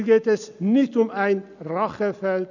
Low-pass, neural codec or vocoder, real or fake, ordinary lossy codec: 7.2 kHz; none; real; none